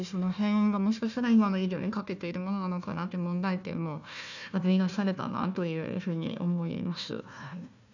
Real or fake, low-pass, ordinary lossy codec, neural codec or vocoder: fake; 7.2 kHz; none; codec, 16 kHz, 1 kbps, FunCodec, trained on Chinese and English, 50 frames a second